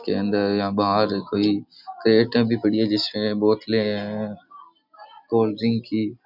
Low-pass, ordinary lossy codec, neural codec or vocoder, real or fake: 5.4 kHz; none; none; real